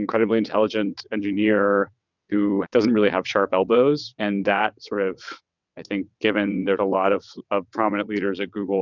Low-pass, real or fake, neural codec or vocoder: 7.2 kHz; fake; vocoder, 22.05 kHz, 80 mel bands, WaveNeXt